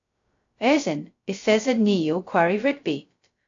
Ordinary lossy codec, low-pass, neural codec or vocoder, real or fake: AAC, 48 kbps; 7.2 kHz; codec, 16 kHz, 0.2 kbps, FocalCodec; fake